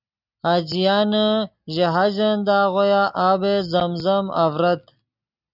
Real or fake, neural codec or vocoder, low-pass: real; none; 5.4 kHz